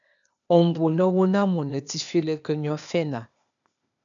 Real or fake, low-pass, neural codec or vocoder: fake; 7.2 kHz; codec, 16 kHz, 0.8 kbps, ZipCodec